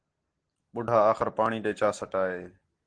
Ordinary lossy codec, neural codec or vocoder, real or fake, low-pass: Opus, 16 kbps; none; real; 9.9 kHz